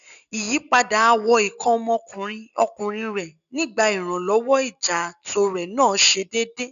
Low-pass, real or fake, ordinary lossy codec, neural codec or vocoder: 7.2 kHz; real; none; none